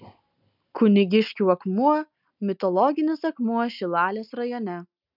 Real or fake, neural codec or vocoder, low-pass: real; none; 5.4 kHz